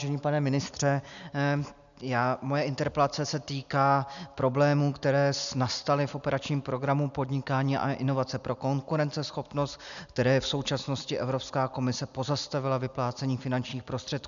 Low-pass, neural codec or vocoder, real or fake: 7.2 kHz; none; real